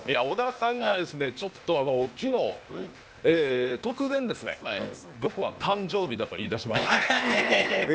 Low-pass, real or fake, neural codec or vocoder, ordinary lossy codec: none; fake; codec, 16 kHz, 0.8 kbps, ZipCodec; none